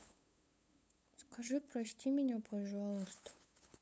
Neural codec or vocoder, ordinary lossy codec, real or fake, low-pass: codec, 16 kHz, 8 kbps, FunCodec, trained on LibriTTS, 25 frames a second; none; fake; none